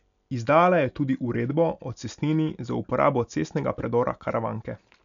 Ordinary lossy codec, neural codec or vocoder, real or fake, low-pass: none; none; real; 7.2 kHz